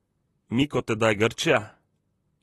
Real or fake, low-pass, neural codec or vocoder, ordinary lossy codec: fake; 19.8 kHz; vocoder, 44.1 kHz, 128 mel bands, Pupu-Vocoder; AAC, 32 kbps